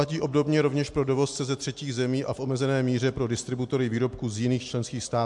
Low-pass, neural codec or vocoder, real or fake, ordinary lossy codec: 10.8 kHz; none; real; MP3, 64 kbps